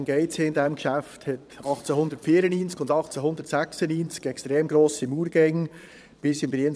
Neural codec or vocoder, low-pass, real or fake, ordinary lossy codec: vocoder, 22.05 kHz, 80 mel bands, Vocos; none; fake; none